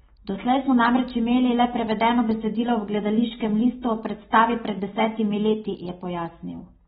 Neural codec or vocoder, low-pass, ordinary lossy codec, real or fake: none; 10.8 kHz; AAC, 16 kbps; real